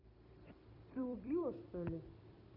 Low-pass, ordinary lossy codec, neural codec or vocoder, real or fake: 5.4 kHz; none; vocoder, 44.1 kHz, 128 mel bands every 256 samples, BigVGAN v2; fake